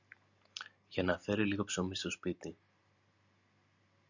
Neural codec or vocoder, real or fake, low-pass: none; real; 7.2 kHz